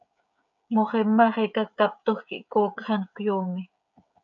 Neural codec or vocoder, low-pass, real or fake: codec, 16 kHz, 16 kbps, FreqCodec, smaller model; 7.2 kHz; fake